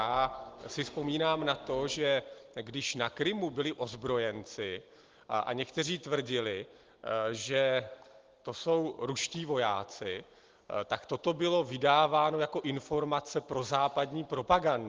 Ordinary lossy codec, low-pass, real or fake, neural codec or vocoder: Opus, 16 kbps; 7.2 kHz; real; none